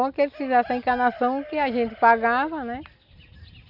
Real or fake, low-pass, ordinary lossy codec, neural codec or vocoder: real; 5.4 kHz; none; none